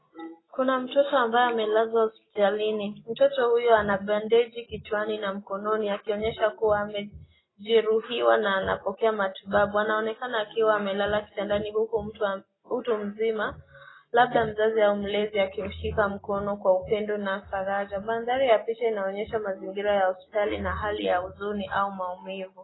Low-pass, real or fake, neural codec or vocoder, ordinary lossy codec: 7.2 kHz; real; none; AAC, 16 kbps